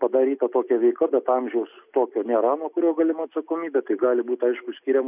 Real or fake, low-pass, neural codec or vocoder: real; 3.6 kHz; none